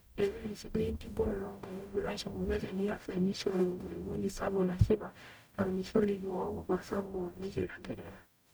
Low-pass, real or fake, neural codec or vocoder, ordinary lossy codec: none; fake; codec, 44.1 kHz, 0.9 kbps, DAC; none